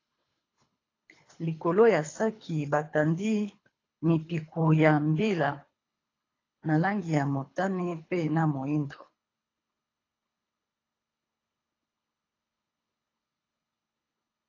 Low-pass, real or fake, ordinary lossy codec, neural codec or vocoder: 7.2 kHz; fake; AAC, 32 kbps; codec, 24 kHz, 3 kbps, HILCodec